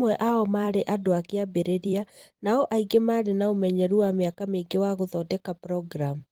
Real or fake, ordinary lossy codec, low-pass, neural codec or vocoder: fake; Opus, 24 kbps; 19.8 kHz; vocoder, 44.1 kHz, 128 mel bands every 256 samples, BigVGAN v2